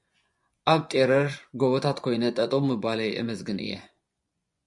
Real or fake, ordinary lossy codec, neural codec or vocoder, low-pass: fake; MP3, 96 kbps; vocoder, 24 kHz, 100 mel bands, Vocos; 10.8 kHz